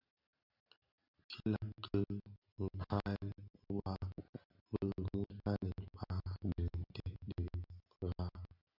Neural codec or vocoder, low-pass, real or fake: none; 5.4 kHz; real